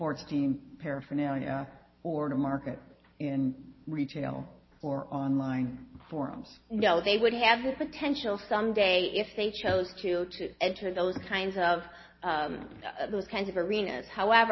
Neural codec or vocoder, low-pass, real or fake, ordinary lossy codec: none; 7.2 kHz; real; MP3, 24 kbps